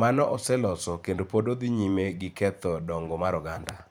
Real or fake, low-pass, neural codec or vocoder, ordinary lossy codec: fake; none; vocoder, 44.1 kHz, 128 mel bands every 512 samples, BigVGAN v2; none